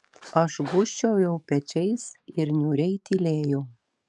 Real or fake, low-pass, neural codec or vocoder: fake; 10.8 kHz; codec, 44.1 kHz, 7.8 kbps, DAC